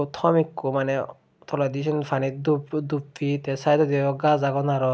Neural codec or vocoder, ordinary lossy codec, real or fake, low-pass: none; none; real; none